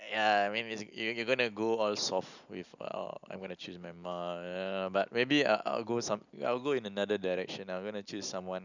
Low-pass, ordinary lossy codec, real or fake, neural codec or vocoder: 7.2 kHz; none; real; none